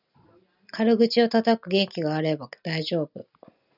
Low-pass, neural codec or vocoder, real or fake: 5.4 kHz; none; real